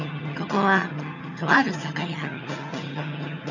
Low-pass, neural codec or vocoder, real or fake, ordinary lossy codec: 7.2 kHz; vocoder, 22.05 kHz, 80 mel bands, HiFi-GAN; fake; none